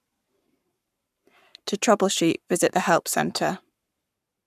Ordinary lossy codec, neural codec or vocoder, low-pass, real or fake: none; codec, 44.1 kHz, 7.8 kbps, Pupu-Codec; 14.4 kHz; fake